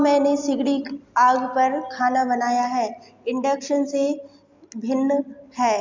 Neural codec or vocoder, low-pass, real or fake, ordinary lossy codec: none; 7.2 kHz; real; MP3, 64 kbps